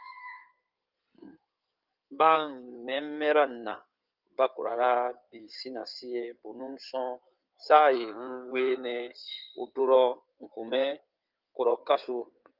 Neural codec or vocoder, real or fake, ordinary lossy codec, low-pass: codec, 16 kHz in and 24 kHz out, 2.2 kbps, FireRedTTS-2 codec; fake; Opus, 24 kbps; 5.4 kHz